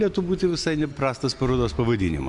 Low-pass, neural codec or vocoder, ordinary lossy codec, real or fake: 10.8 kHz; none; MP3, 64 kbps; real